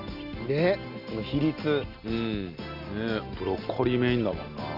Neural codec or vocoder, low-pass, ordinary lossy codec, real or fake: none; 5.4 kHz; Opus, 64 kbps; real